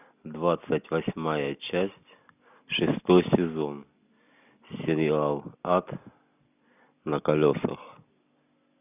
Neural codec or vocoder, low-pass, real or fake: none; 3.6 kHz; real